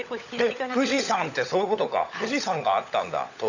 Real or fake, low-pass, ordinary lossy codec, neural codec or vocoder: fake; 7.2 kHz; none; codec, 16 kHz, 16 kbps, FunCodec, trained on LibriTTS, 50 frames a second